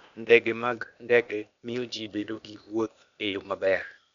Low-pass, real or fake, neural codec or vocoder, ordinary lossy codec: 7.2 kHz; fake; codec, 16 kHz, 0.8 kbps, ZipCodec; none